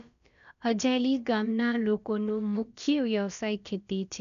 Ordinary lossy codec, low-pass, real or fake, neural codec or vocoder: none; 7.2 kHz; fake; codec, 16 kHz, about 1 kbps, DyCAST, with the encoder's durations